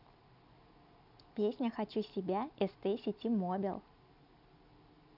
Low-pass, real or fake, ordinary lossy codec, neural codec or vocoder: 5.4 kHz; real; none; none